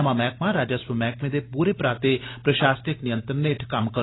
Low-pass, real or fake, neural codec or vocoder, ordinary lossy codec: 7.2 kHz; real; none; AAC, 16 kbps